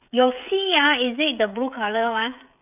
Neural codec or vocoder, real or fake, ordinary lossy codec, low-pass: codec, 16 kHz, 16 kbps, FreqCodec, smaller model; fake; none; 3.6 kHz